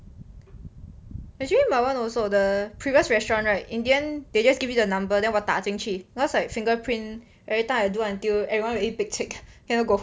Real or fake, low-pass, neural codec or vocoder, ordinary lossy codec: real; none; none; none